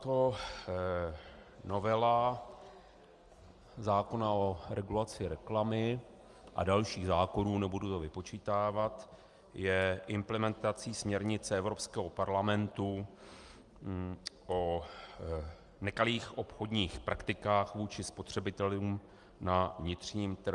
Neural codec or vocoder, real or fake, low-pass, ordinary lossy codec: none; real; 10.8 kHz; Opus, 32 kbps